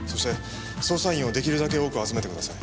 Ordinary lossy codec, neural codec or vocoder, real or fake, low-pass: none; none; real; none